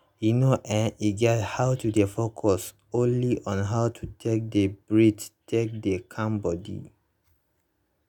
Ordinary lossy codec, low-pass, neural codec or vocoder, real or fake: none; 19.8 kHz; none; real